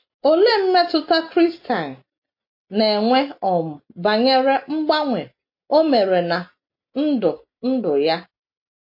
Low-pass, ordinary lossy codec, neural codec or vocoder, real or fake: 5.4 kHz; MP3, 32 kbps; none; real